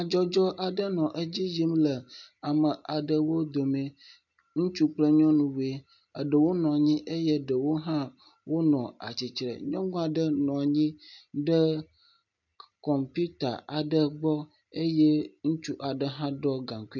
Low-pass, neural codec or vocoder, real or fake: 7.2 kHz; none; real